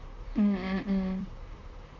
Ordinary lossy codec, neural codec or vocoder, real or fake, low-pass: none; vocoder, 44.1 kHz, 128 mel bands, Pupu-Vocoder; fake; 7.2 kHz